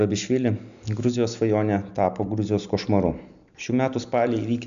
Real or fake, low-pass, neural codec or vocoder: real; 7.2 kHz; none